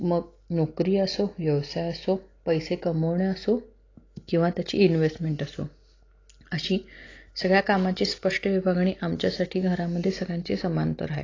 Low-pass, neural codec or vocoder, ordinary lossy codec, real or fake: 7.2 kHz; none; AAC, 32 kbps; real